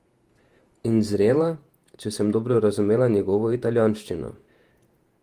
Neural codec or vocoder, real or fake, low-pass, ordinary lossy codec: vocoder, 48 kHz, 128 mel bands, Vocos; fake; 14.4 kHz; Opus, 24 kbps